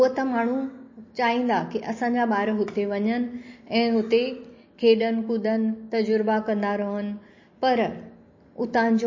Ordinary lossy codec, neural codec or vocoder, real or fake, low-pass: MP3, 32 kbps; none; real; 7.2 kHz